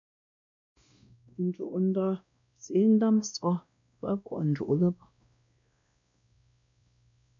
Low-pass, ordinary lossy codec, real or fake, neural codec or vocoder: 7.2 kHz; MP3, 64 kbps; fake; codec, 16 kHz, 1 kbps, X-Codec, WavLM features, trained on Multilingual LibriSpeech